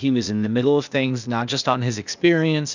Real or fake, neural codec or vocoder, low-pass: fake; codec, 16 kHz, 0.8 kbps, ZipCodec; 7.2 kHz